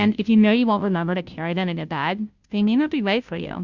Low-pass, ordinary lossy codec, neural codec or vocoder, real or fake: 7.2 kHz; Opus, 64 kbps; codec, 16 kHz, 0.5 kbps, FunCodec, trained on Chinese and English, 25 frames a second; fake